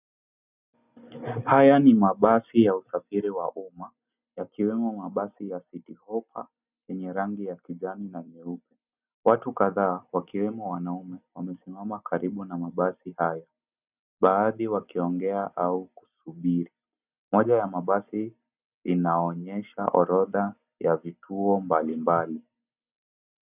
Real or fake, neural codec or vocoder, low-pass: real; none; 3.6 kHz